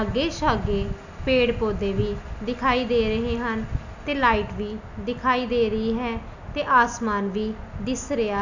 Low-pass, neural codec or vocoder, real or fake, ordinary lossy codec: 7.2 kHz; none; real; none